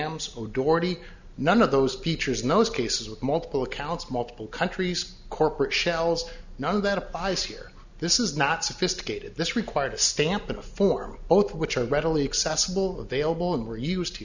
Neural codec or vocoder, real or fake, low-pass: none; real; 7.2 kHz